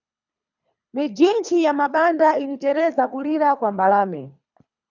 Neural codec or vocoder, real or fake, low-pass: codec, 24 kHz, 3 kbps, HILCodec; fake; 7.2 kHz